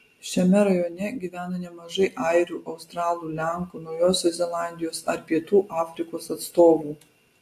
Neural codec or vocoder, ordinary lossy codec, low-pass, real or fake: none; AAC, 64 kbps; 14.4 kHz; real